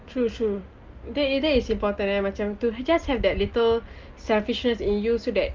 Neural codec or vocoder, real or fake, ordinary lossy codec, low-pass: none; real; Opus, 32 kbps; 7.2 kHz